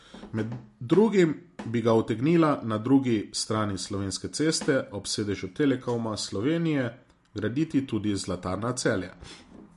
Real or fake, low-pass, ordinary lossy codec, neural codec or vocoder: real; 14.4 kHz; MP3, 48 kbps; none